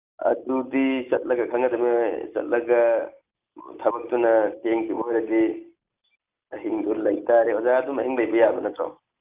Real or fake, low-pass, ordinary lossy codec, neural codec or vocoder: real; 3.6 kHz; Opus, 24 kbps; none